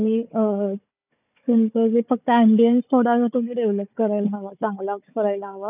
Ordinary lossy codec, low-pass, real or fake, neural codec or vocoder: none; 3.6 kHz; fake; codec, 16 kHz, 4 kbps, FunCodec, trained on Chinese and English, 50 frames a second